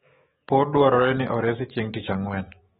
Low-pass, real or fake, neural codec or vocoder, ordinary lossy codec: 19.8 kHz; fake; autoencoder, 48 kHz, 128 numbers a frame, DAC-VAE, trained on Japanese speech; AAC, 16 kbps